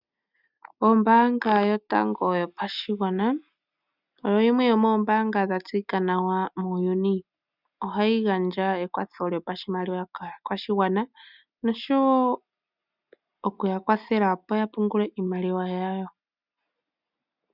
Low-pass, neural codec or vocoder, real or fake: 5.4 kHz; none; real